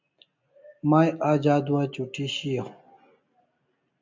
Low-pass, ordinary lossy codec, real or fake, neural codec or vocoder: 7.2 kHz; MP3, 64 kbps; real; none